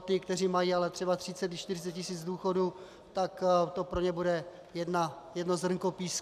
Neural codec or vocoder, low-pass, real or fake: none; 14.4 kHz; real